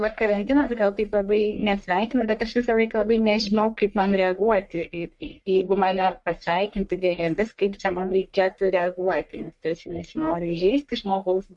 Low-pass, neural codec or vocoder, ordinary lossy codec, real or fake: 10.8 kHz; codec, 44.1 kHz, 1.7 kbps, Pupu-Codec; MP3, 64 kbps; fake